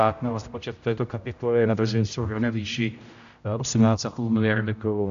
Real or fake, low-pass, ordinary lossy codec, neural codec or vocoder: fake; 7.2 kHz; MP3, 64 kbps; codec, 16 kHz, 0.5 kbps, X-Codec, HuBERT features, trained on general audio